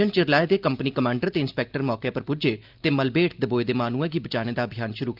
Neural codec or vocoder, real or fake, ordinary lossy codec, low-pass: none; real; Opus, 32 kbps; 5.4 kHz